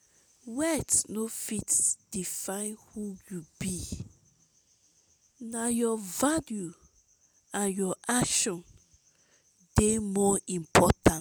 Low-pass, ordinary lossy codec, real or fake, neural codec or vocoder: none; none; real; none